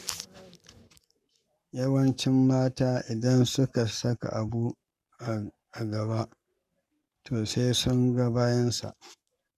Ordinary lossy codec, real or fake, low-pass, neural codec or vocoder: none; fake; 14.4 kHz; codec, 44.1 kHz, 7.8 kbps, Pupu-Codec